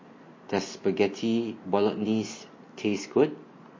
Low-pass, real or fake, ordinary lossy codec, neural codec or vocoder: 7.2 kHz; real; MP3, 32 kbps; none